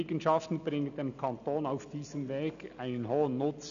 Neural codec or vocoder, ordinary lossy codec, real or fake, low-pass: none; none; real; 7.2 kHz